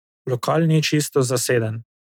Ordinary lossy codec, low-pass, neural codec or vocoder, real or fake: none; 19.8 kHz; none; real